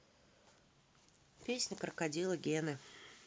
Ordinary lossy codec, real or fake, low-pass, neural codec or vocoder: none; real; none; none